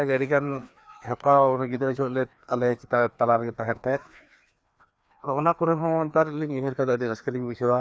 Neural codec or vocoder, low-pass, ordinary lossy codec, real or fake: codec, 16 kHz, 2 kbps, FreqCodec, larger model; none; none; fake